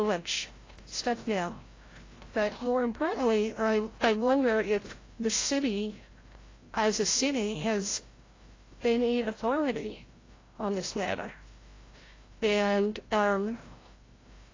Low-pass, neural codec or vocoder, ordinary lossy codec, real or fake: 7.2 kHz; codec, 16 kHz, 0.5 kbps, FreqCodec, larger model; AAC, 32 kbps; fake